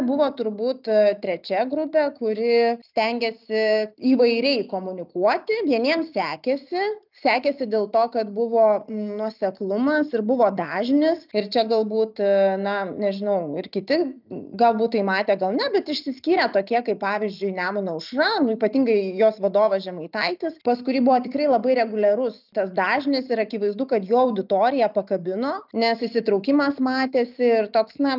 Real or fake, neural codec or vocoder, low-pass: real; none; 5.4 kHz